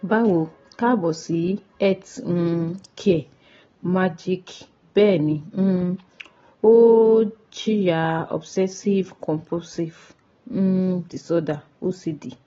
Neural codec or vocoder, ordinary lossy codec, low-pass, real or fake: none; AAC, 24 kbps; 7.2 kHz; real